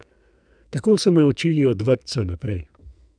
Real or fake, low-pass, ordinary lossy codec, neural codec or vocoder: fake; 9.9 kHz; none; codec, 44.1 kHz, 2.6 kbps, SNAC